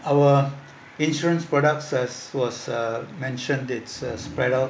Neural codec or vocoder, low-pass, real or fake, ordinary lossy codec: none; none; real; none